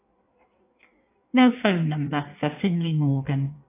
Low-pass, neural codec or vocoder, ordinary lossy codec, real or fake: 3.6 kHz; codec, 16 kHz in and 24 kHz out, 1.1 kbps, FireRedTTS-2 codec; AAC, 24 kbps; fake